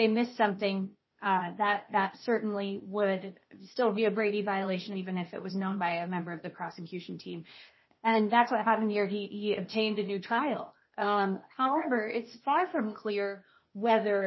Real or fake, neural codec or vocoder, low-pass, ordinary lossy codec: fake; codec, 16 kHz, 0.8 kbps, ZipCodec; 7.2 kHz; MP3, 24 kbps